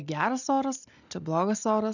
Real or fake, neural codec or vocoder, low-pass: real; none; 7.2 kHz